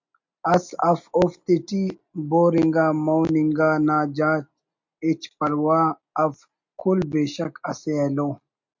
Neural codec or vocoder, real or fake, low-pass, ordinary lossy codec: none; real; 7.2 kHz; MP3, 48 kbps